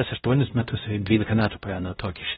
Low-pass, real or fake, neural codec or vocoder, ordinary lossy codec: 7.2 kHz; fake; codec, 16 kHz, 0.5 kbps, X-Codec, WavLM features, trained on Multilingual LibriSpeech; AAC, 16 kbps